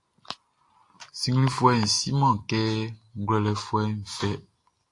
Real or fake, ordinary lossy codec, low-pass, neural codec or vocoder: real; AAC, 48 kbps; 10.8 kHz; none